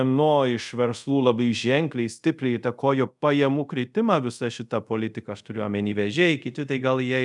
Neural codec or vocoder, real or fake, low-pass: codec, 24 kHz, 0.5 kbps, DualCodec; fake; 10.8 kHz